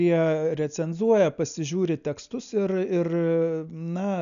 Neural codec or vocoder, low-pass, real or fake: none; 7.2 kHz; real